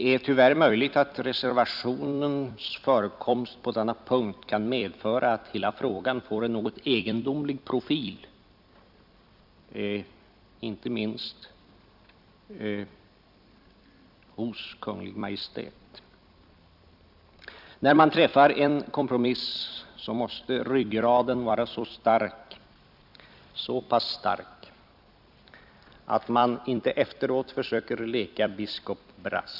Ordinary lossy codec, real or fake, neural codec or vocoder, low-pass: none; fake; vocoder, 44.1 kHz, 128 mel bands every 256 samples, BigVGAN v2; 5.4 kHz